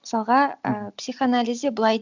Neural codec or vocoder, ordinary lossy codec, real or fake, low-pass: none; none; real; 7.2 kHz